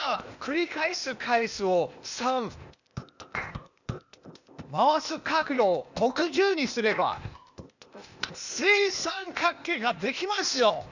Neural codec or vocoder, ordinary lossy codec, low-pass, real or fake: codec, 16 kHz, 0.8 kbps, ZipCodec; none; 7.2 kHz; fake